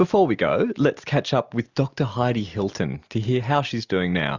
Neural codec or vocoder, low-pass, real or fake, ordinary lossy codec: none; 7.2 kHz; real; Opus, 64 kbps